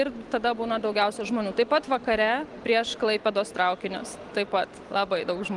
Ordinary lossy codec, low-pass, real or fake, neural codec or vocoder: Opus, 24 kbps; 10.8 kHz; real; none